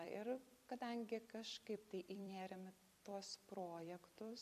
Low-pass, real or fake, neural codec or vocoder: 14.4 kHz; fake; vocoder, 44.1 kHz, 128 mel bands every 256 samples, BigVGAN v2